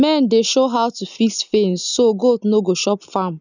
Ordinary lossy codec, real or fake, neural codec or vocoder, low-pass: none; real; none; 7.2 kHz